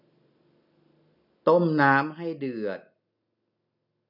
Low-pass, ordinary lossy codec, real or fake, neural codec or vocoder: 5.4 kHz; none; real; none